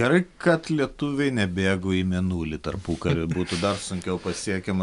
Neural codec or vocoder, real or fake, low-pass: none; real; 10.8 kHz